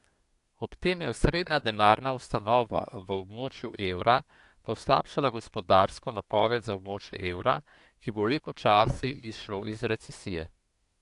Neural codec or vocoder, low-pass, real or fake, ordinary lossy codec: codec, 24 kHz, 1 kbps, SNAC; 10.8 kHz; fake; AAC, 64 kbps